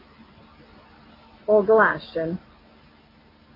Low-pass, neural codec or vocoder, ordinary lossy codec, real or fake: 5.4 kHz; none; AAC, 24 kbps; real